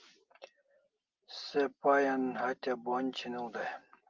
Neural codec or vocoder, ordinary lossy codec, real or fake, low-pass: none; Opus, 24 kbps; real; 7.2 kHz